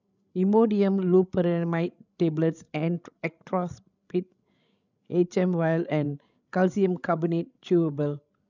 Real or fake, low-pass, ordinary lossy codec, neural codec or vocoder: fake; 7.2 kHz; none; codec, 16 kHz, 16 kbps, FreqCodec, larger model